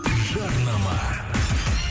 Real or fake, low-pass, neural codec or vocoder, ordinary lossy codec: real; none; none; none